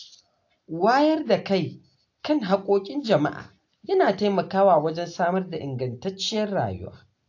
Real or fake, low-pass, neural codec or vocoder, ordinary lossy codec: real; 7.2 kHz; none; AAC, 48 kbps